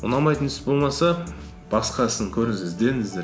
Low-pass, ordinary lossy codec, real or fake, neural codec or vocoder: none; none; real; none